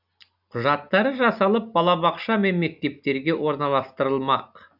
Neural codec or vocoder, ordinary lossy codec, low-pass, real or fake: none; none; 5.4 kHz; real